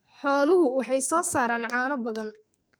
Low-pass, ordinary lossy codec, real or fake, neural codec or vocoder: none; none; fake; codec, 44.1 kHz, 2.6 kbps, SNAC